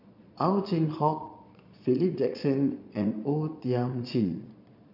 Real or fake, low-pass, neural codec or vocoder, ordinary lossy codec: fake; 5.4 kHz; vocoder, 22.05 kHz, 80 mel bands, Vocos; none